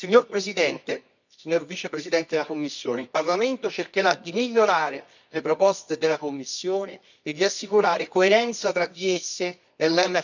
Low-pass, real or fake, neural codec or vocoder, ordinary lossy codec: 7.2 kHz; fake; codec, 24 kHz, 0.9 kbps, WavTokenizer, medium music audio release; none